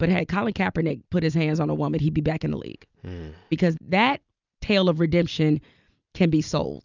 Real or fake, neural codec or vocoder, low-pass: real; none; 7.2 kHz